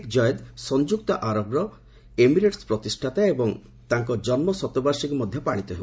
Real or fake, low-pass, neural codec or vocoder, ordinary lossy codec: real; none; none; none